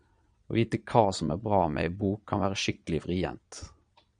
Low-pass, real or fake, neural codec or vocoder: 9.9 kHz; fake; vocoder, 22.05 kHz, 80 mel bands, Vocos